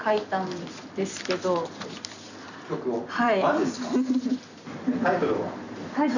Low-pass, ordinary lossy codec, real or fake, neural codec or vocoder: 7.2 kHz; none; fake; vocoder, 44.1 kHz, 128 mel bands every 256 samples, BigVGAN v2